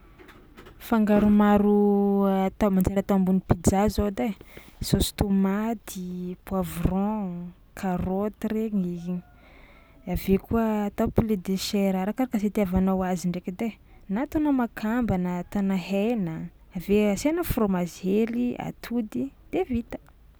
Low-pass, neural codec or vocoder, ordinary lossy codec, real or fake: none; none; none; real